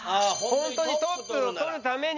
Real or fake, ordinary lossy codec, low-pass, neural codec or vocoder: real; none; 7.2 kHz; none